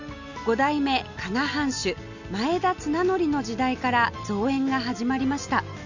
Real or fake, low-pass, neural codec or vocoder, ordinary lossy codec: real; 7.2 kHz; none; none